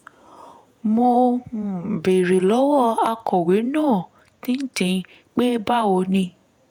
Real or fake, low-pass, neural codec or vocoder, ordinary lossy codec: fake; none; vocoder, 48 kHz, 128 mel bands, Vocos; none